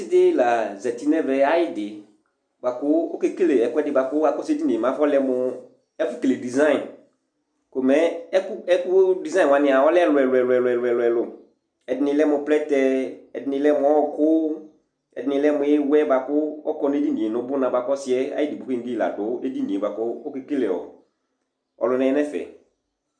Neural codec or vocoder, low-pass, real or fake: none; 9.9 kHz; real